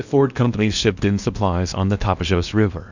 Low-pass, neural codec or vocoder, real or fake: 7.2 kHz; codec, 16 kHz in and 24 kHz out, 0.8 kbps, FocalCodec, streaming, 65536 codes; fake